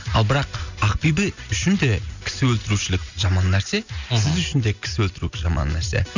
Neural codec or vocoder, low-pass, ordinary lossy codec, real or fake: none; 7.2 kHz; none; real